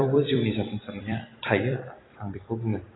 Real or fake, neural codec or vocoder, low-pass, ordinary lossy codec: fake; vocoder, 44.1 kHz, 128 mel bands every 256 samples, BigVGAN v2; 7.2 kHz; AAC, 16 kbps